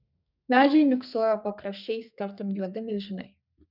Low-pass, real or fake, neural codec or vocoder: 5.4 kHz; fake; codec, 32 kHz, 1.9 kbps, SNAC